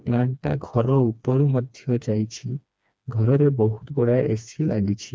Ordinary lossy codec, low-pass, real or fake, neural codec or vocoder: none; none; fake; codec, 16 kHz, 2 kbps, FreqCodec, smaller model